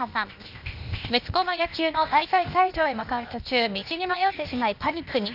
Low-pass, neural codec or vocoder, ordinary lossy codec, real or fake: 5.4 kHz; codec, 16 kHz, 0.8 kbps, ZipCodec; none; fake